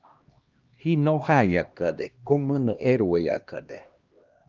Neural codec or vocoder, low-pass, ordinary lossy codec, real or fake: codec, 16 kHz, 1 kbps, X-Codec, HuBERT features, trained on LibriSpeech; 7.2 kHz; Opus, 32 kbps; fake